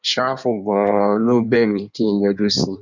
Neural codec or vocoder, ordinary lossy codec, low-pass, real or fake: codec, 16 kHz in and 24 kHz out, 1.1 kbps, FireRedTTS-2 codec; none; 7.2 kHz; fake